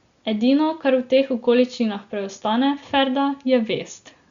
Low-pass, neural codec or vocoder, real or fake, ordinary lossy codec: 7.2 kHz; none; real; Opus, 64 kbps